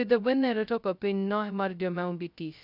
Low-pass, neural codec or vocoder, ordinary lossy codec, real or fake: 5.4 kHz; codec, 16 kHz, 0.2 kbps, FocalCodec; none; fake